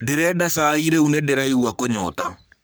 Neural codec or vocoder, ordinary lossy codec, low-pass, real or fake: codec, 44.1 kHz, 3.4 kbps, Pupu-Codec; none; none; fake